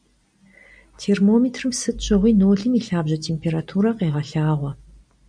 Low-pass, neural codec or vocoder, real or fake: 9.9 kHz; none; real